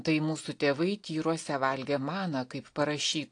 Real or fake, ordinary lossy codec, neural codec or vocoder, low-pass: real; AAC, 48 kbps; none; 9.9 kHz